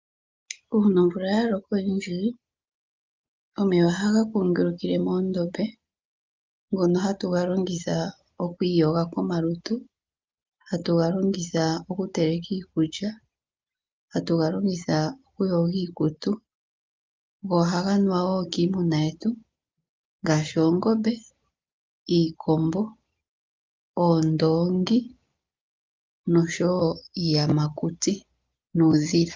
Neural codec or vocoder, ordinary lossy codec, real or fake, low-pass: none; Opus, 24 kbps; real; 7.2 kHz